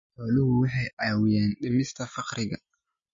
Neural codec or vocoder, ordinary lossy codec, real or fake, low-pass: none; MP3, 32 kbps; real; 7.2 kHz